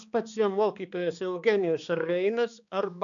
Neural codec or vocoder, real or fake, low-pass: codec, 16 kHz, 2 kbps, X-Codec, HuBERT features, trained on balanced general audio; fake; 7.2 kHz